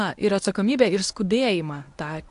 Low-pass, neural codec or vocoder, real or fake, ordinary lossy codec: 10.8 kHz; codec, 24 kHz, 0.9 kbps, WavTokenizer, medium speech release version 1; fake; AAC, 64 kbps